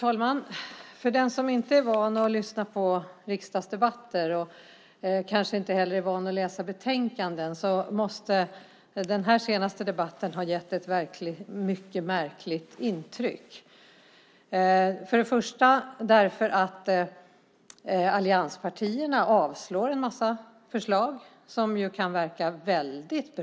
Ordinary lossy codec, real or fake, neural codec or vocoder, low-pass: none; real; none; none